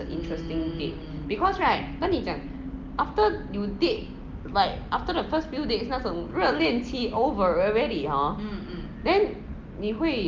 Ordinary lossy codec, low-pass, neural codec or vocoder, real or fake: Opus, 32 kbps; 7.2 kHz; none; real